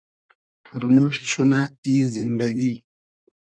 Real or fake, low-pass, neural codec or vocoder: fake; 9.9 kHz; codec, 24 kHz, 1 kbps, SNAC